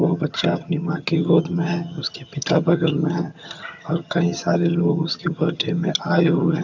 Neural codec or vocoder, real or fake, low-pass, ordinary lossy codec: vocoder, 22.05 kHz, 80 mel bands, HiFi-GAN; fake; 7.2 kHz; MP3, 64 kbps